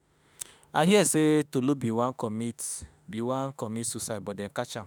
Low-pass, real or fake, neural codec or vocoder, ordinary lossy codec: none; fake; autoencoder, 48 kHz, 32 numbers a frame, DAC-VAE, trained on Japanese speech; none